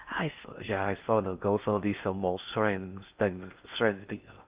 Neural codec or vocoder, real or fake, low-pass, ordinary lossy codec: codec, 16 kHz in and 24 kHz out, 0.6 kbps, FocalCodec, streaming, 4096 codes; fake; 3.6 kHz; Opus, 24 kbps